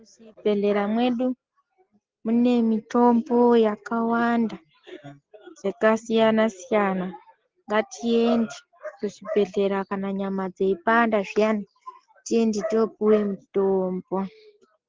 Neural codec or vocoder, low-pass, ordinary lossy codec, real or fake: none; 7.2 kHz; Opus, 16 kbps; real